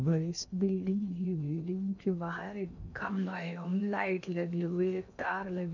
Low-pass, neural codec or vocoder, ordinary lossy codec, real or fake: 7.2 kHz; codec, 16 kHz in and 24 kHz out, 0.6 kbps, FocalCodec, streaming, 4096 codes; none; fake